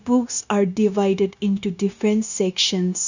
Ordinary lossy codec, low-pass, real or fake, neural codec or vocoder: none; 7.2 kHz; fake; codec, 16 kHz, 0.9 kbps, LongCat-Audio-Codec